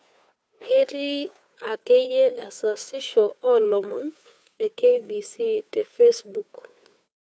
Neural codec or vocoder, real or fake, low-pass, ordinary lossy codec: codec, 16 kHz, 2 kbps, FunCodec, trained on Chinese and English, 25 frames a second; fake; none; none